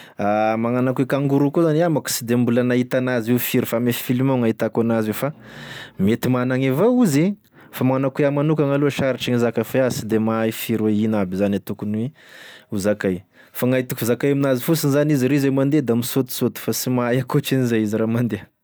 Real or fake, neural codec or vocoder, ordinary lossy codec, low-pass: real; none; none; none